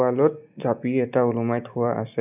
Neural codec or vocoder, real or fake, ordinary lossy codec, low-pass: none; real; none; 3.6 kHz